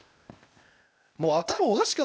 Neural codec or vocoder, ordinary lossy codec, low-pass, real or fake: codec, 16 kHz, 0.8 kbps, ZipCodec; none; none; fake